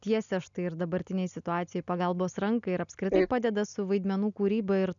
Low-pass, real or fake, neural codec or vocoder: 7.2 kHz; real; none